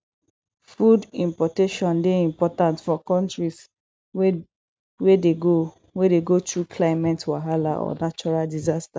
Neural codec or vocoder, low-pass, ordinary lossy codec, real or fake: none; none; none; real